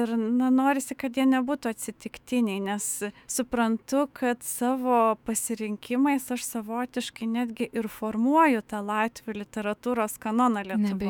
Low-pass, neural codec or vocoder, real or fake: 19.8 kHz; autoencoder, 48 kHz, 128 numbers a frame, DAC-VAE, trained on Japanese speech; fake